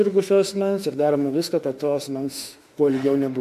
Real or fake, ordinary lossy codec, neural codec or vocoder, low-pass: fake; MP3, 96 kbps; autoencoder, 48 kHz, 32 numbers a frame, DAC-VAE, trained on Japanese speech; 14.4 kHz